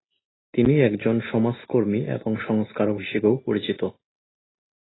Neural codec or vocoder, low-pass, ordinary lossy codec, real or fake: none; 7.2 kHz; AAC, 16 kbps; real